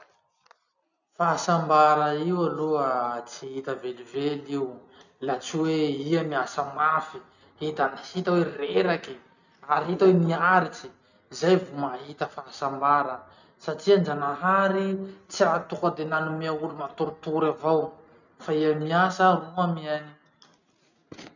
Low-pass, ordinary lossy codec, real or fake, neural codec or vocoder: 7.2 kHz; none; real; none